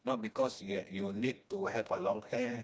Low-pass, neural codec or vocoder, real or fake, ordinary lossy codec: none; codec, 16 kHz, 1 kbps, FreqCodec, smaller model; fake; none